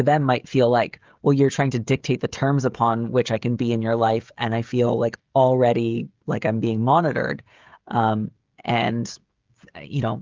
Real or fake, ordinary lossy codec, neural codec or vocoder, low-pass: fake; Opus, 32 kbps; codec, 16 kHz, 16 kbps, FreqCodec, smaller model; 7.2 kHz